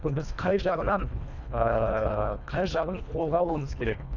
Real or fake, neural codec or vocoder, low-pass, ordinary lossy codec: fake; codec, 24 kHz, 1.5 kbps, HILCodec; 7.2 kHz; none